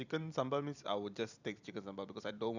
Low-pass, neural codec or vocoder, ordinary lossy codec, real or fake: 7.2 kHz; vocoder, 44.1 kHz, 128 mel bands every 256 samples, BigVGAN v2; none; fake